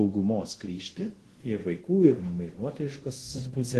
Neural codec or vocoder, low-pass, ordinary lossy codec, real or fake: codec, 24 kHz, 0.5 kbps, DualCodec; 10.8 kHz; Opus, 16 kbps; fake